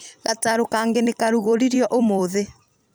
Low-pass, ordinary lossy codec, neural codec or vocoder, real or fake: none; none; vocoder, 44.1 kHz, 128 mel bands every 256 samples, BigVGAN v2; fake